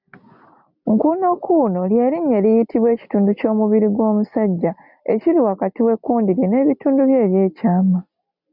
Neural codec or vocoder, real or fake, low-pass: none; real; 5.4 kHz